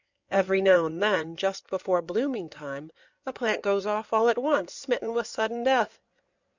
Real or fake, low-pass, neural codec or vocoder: fake; 7.2 kHz; codec, 16 kHz in and 24 kHz out, 2.2 kbps, FireRedTTS-2 codec